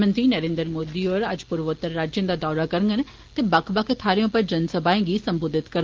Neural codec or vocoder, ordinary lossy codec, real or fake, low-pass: none; Opus, 16 kbps; real; 7.2 kHz